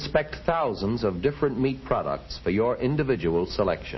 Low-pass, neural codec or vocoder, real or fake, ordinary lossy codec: 7.2 kHz; none; real; MP3, 24 kbps